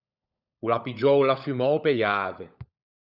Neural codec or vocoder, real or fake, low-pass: codec, 16 kHz, 16 kbps, FunCodec, trained on LibriTTS, 50 frames a second; fake; 5.4 kHz